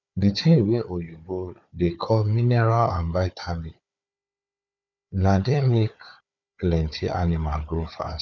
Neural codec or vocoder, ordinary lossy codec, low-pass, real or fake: codec, 16 kHz, 4 kbps, FunCodec, trained on Chinese and English, 50 frames a second; none; 7.2 kHz; fake